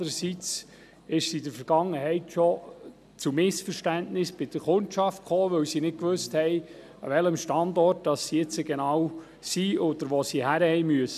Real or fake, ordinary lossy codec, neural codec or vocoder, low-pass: real; none; none; 14.4 kHz